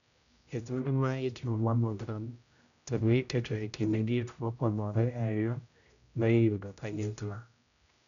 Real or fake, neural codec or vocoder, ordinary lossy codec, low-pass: fake; codec, 16 kHz, 0.5 kbps, X-Codec, HuBERT features, trained on general audio; none; 7.2 kHz